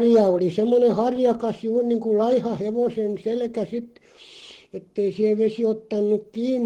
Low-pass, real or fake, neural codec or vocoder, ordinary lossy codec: 19.8 kHz; fake; codec, 44.1 kHz, 7.8 kbps, Pupu-Codec; Opus, 16 kbps